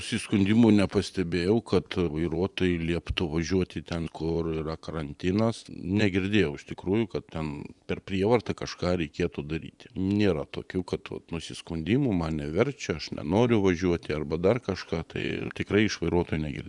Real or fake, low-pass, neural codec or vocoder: fake; 10.8 kHz; vocoder, 24 kHz, 100 mel bands, Vocos